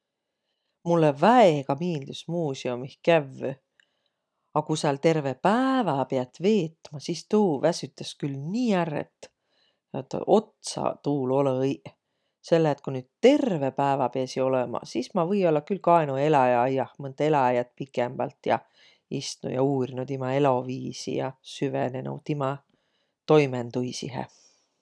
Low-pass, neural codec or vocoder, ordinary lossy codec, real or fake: none; none; none; real